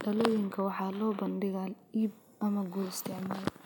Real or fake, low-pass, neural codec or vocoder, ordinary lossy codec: real; none; none; none